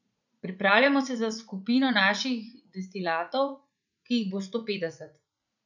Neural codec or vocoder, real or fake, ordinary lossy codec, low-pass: vocoder, 44.1 kHz, 80 mel bands, Vocos; fake; none; 7.2 kHz